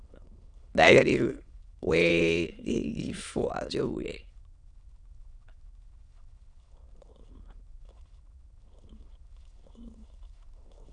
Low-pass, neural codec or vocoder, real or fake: 9.9 kHz; autoencoder, 22.05 kHz, a latent of 192 numbers a frame, VITS, trained on many speakers; fake